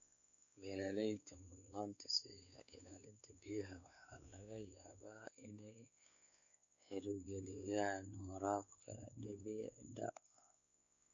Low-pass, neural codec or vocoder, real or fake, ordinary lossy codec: 7.2 kHz; codec, 16 kHz, 4 kbps, X-Codec, WavLM features, trained on Multilingual LibriSpeech; fake; none